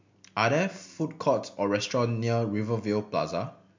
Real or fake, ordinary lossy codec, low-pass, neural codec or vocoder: real; MP3, 64 kbps; 7.2 kHz; none